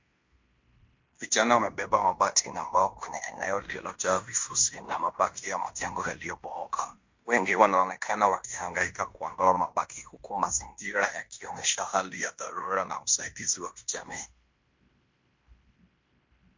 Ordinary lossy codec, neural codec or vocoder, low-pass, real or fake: MP3, 48 kbps; codec, 16 kHz in and 24 kHz out, 0.9 kbps, LongCat-Audio-Codec, fine tuned four codebook decoder; 7.2 kHz; fake